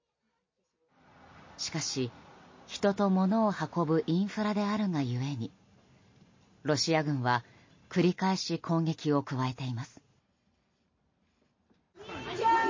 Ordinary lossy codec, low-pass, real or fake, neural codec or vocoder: MP3, 32 kbps; 7.2 kHz; real; none